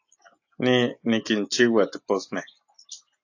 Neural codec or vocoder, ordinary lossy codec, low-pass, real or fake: none; AAC, 48 kbps; 7.2 kHz; real